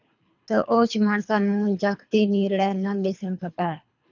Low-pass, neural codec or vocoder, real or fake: 7.2 kHz; codec, 24 kHz, 3 kbps, HILCodec; fake